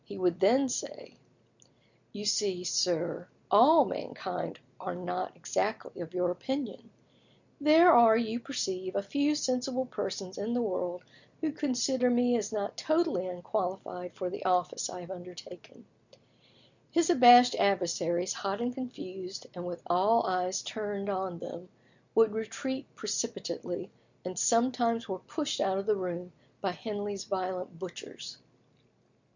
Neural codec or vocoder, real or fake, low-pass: none; real; 7.2 kHz